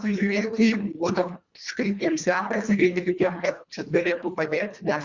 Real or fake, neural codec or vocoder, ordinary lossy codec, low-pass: fake; codec, 24 kHz, 1.5 kbps, HILCodec; Opus, 64 kbps; 7.2 kHz